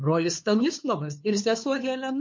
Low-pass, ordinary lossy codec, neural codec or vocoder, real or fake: 7.2 kHz; MP3, 48 kbps; codec, 16 kHz, 2 kbps, FunCodec, trained on LibriTTS, 25 frames a second; fake